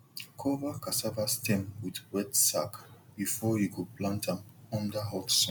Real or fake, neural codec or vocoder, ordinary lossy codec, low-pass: real; none; none; none